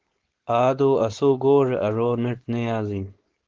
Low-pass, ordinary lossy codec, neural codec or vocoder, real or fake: 7.2 kHz; Opus, 16 kbps; codec, 16 kHz, 4.8 kbps, FACodec; fake